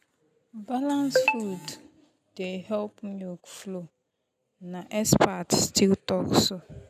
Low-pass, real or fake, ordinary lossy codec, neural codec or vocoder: 14.4 kHz; real; none; none